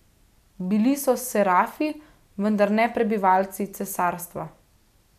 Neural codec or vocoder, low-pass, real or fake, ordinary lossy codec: none; 14.4 kHz; real; none